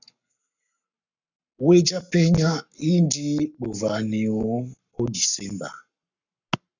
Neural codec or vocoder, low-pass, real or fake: codec, 44.1 kHz, 7.8 kbps, Pupu-Codec; 7.2 kHz; fake